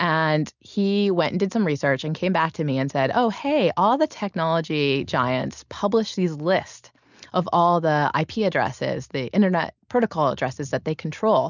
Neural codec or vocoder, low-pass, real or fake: none; 7.2 kHz; real